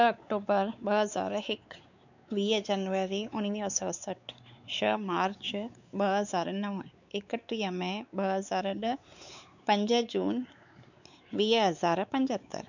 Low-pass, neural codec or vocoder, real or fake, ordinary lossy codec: 7.2 kHz; codec, 16 kHz, 4 kbps, X-Codec, WavLM features, trained on Multilingual LibriSpeech; fake; none